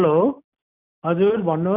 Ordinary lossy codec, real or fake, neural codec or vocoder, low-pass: none; real; none; 3.6 kHz